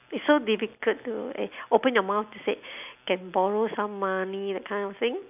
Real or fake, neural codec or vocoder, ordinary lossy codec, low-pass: real; none; none; 3.6 kHz